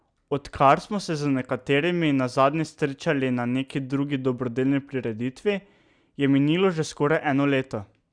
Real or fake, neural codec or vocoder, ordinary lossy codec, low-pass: real; none; Opus, 64 kbps; 9.9 kHz